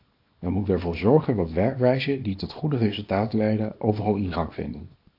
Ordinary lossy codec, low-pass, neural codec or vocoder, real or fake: AAC, 32 kbps; 5.4 kHz; codec, 24 kHz, 0.9 kbps, WavTokenizer, small release; fake